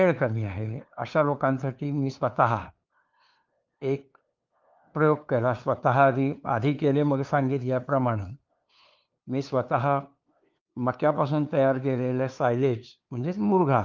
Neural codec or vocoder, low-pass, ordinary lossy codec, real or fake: codec, 16 kHz, 2 kbps, FunCodec, trained on LibriTTS, 25 frames a second; 7.2 kHz; Opus, 24 kbps; fake